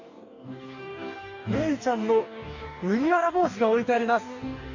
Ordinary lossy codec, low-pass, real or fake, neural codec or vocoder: none; 7.2 kHz; fake; codec, 44.1 kHz, 2.6 kbps, DAC